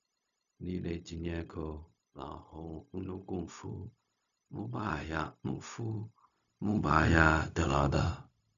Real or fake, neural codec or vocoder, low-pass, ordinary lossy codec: fake; codec, 16 kHz, 0.4 kbps, LongCat-Audio-Codec; 7.2 kHz; none